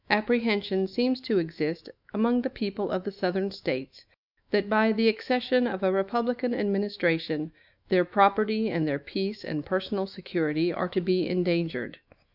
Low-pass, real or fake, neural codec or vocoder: 5.4 kHz; fake; autoencoder, 48 kHz, 128 numbers a frame, DAC-VAE, trained on Japanese speech